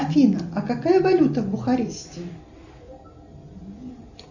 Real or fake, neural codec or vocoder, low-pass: real; none; 7.2 kHz